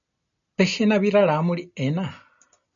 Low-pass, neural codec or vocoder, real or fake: 7.2 kHz; none; real